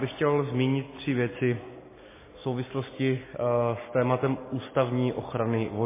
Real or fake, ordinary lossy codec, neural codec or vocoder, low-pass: real; MP3, 16 kbps; none; 3.6 kHz